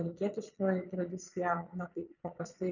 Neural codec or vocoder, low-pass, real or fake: vocoder, 22.05 kHz, 80 mel bands, Vocos; 7.2 kHz; fake